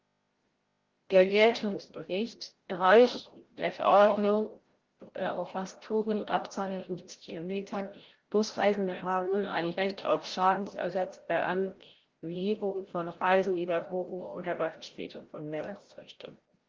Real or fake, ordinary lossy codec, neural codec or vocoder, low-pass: fake; Opus, 16 kbps; codec, 16 kHz, 0.5 kbps, FreqCodec, larger model; 7.2 kHz